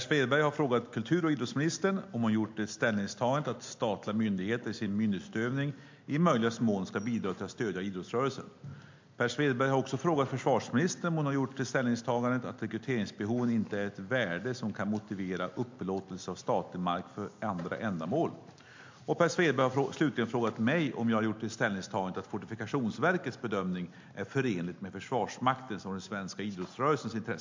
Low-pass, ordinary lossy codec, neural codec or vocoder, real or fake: 7.2 kHz; MP3, 48 kbps; none; real